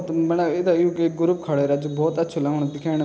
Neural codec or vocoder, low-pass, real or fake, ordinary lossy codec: none; none; real; none